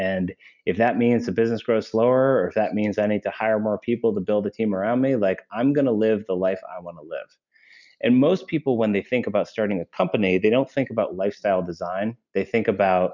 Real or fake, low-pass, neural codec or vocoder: real; 7.2 kHz; none